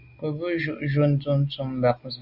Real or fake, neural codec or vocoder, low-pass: real; none; 5.4 kHz